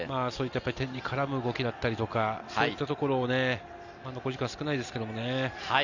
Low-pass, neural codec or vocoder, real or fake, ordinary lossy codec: 7.2 kHz; none; real; none